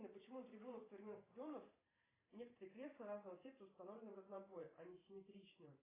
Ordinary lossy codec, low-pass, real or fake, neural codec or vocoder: AAC, 16 kbps; 3.6 kHz; fake; vocoder, 44.1 kHz, 128 mel bands every 512 samples, BigVGAN v2